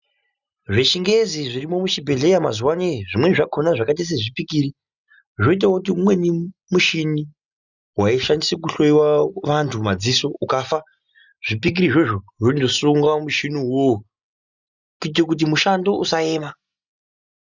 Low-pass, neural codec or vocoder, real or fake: 7.2 kHz; none; real